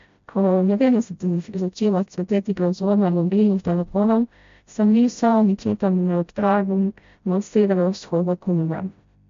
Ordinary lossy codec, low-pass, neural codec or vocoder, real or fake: MP3, 48 kbps; 7.2 kHz; codec, 16 kHz, 0.5 kbps, FreqCodec, smaller model; fake